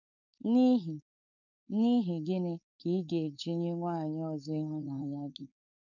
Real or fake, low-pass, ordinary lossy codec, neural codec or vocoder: fake; 7.2 kHz; none; codec, 16 kHz, 4.8 kbps, FACodec